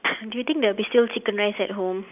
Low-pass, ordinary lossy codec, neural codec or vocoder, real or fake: 3.6 kHz; none; none; real